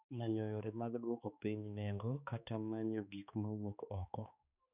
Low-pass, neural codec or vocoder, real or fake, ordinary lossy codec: 3.6 kHz; codec, 16 kHz, 2 kbps, X-Codec, HuBERT features, trained on balanced general audio; fake; none